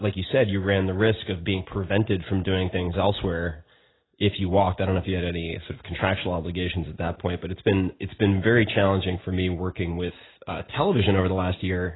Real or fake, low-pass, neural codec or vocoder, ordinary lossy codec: real; 7.2 kHz; none; AAC, 16 kbps